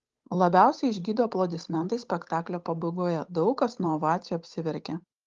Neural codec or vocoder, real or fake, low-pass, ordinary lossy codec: codec, 16 kHz, 8 kbps, FunCodec, trained on Chinese and English, 25 frames a second; fake; 7.2 kHz; Opus, 32 kbps